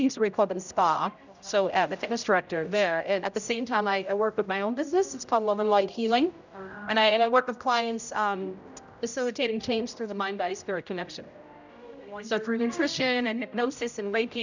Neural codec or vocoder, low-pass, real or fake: codec, 16 kHz, 0.5 kbps, X-Codec, HuBERT features, trained on general audio; 7.2 kHz; fake